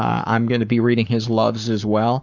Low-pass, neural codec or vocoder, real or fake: 7.2 kHz; codec, 44.1 kHz, 7.8 kbps, Pupu-Codec; fake